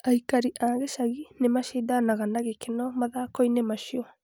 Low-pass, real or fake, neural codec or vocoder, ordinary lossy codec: none; real; none; none